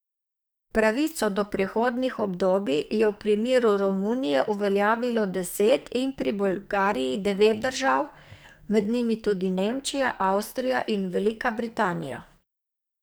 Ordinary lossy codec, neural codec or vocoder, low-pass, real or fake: none; codec, 44.1 kHz, 2.6 kbps, SNAC; none; fake